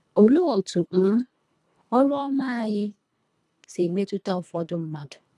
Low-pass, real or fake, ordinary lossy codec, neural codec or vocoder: none; fake; none; codec, 24 kHz, 1.5 kbps, HILCodec